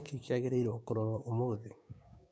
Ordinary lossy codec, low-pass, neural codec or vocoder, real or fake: none; none; codec, 16 kHz, 6 kbps, DAC; fake